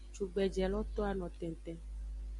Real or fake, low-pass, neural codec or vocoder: real; 10.8 kHz; none